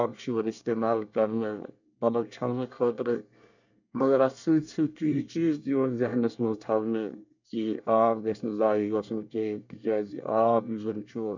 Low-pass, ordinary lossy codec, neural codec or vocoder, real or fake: 7.2 kHz; none; codec, 24 kHz, 1 kbps, SNAC; fake